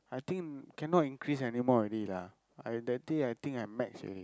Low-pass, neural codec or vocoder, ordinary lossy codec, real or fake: none; none; none; real